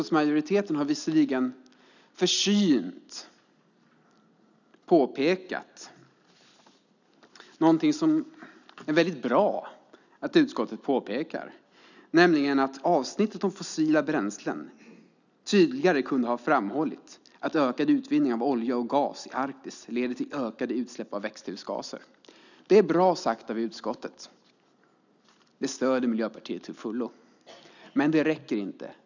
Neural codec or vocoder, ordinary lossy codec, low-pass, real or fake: none; none; 7.2 kHz; real